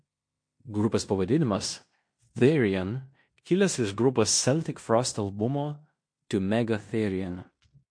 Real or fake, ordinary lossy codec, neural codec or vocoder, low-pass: fake; MP3, 48 kbps; codec, 16 kHz in and 24 kHz out, 0.9 kbps, LongCat-Audio-Codec, fine tuned four codebook decoder; 9.9 kHz